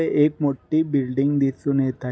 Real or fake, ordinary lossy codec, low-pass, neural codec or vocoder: real; none; none; none